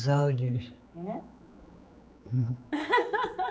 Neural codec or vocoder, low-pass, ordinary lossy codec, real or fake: codec, 16 kHz, 4 kbps, X-Codec, HuBERT features, trained on general audio; none; none; fake